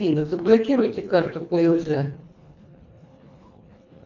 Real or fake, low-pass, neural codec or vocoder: fake; 7.2 kHz; codec, 24 kHz, 1.5 kbps, HILCodec